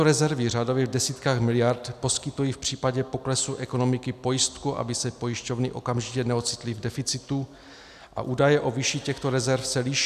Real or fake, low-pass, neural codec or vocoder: real; 14.4 kHz; none